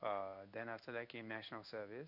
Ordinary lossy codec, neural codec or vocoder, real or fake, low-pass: none; codec, 16 kHz in and 24 kHz out, 1 kbps, XY-Tokenizer; fake; 5.4 kHz